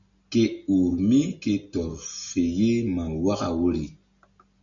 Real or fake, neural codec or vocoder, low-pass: real; none; 7.2 kHz